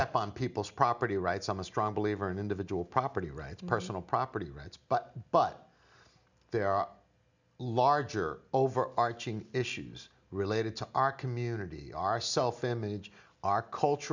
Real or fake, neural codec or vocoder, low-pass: real; none; 7.2 kHz